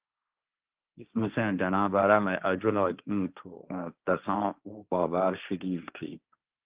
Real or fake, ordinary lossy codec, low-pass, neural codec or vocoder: fake; Opus, 32 kbps; 3.6 kHz; codec, 16 kHz, 1.1 kbps, Voila-Tokenizer